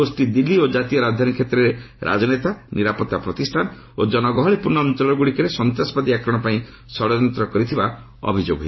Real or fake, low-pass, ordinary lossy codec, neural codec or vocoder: real; 7.2 kHz; MP3, 24 kbps; none